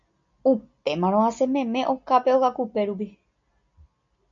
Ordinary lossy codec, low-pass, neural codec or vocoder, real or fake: MP3, 48 kbps; 7.2 kHz; none; real